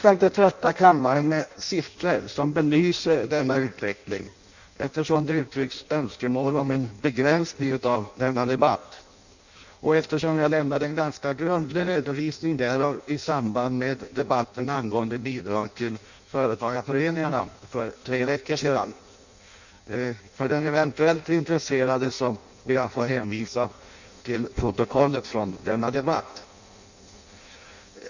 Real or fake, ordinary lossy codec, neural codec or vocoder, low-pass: fake; none; codec, 16 kHz in and 24 kHz out, 0.6 kbps, FireRedTTS-2 codec; 7.2 kHz